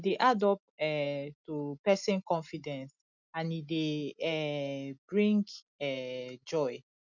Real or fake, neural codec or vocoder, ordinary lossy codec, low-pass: real; none; none; 7.2 kHz